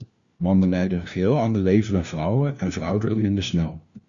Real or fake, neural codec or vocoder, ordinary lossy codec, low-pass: fake; codec, 16 kHz, 1 kbps, FunCodec, trained on LibriTTS, 50 frames a second; Opus, 64 kbps; 7.2 kHz